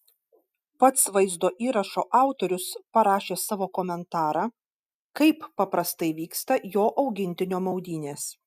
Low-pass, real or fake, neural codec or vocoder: 14.4 kHz; real; none